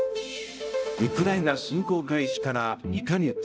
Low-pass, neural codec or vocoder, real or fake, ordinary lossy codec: none; codec, 16 kHz, 0.5 kbps, X-Codec, HuBERT features, trained on balanced general audio; fake; none